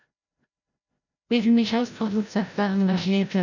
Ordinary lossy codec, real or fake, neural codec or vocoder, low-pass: none; fake; codec, 16 kHz, 0.5 kbps, FreqCodec, larger model; 7.2 kHz